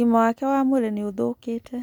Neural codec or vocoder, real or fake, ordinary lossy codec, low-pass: none; real; none; none